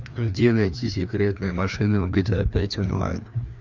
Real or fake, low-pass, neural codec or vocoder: fake; 7.2 kHz; codec, 16 kHz, 2 kbps, FreqCodec, larger model